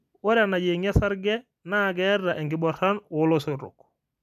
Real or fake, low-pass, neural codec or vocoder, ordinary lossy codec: real; 14.4 kHz; none; none